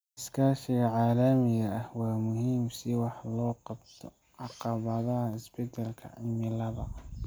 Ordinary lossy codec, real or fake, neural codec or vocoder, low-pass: none; real; none; none